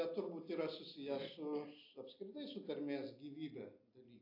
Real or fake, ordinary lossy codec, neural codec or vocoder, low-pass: real; AAC, 48 kbps; none; 5.4 kHz